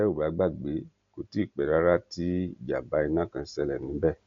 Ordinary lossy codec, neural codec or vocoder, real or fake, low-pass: MP3, 48 kbps; none; real; 7.2 kHz